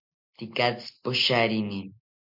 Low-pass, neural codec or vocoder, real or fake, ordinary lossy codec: 5.4 kHz; none; real; MP3, 48 kbps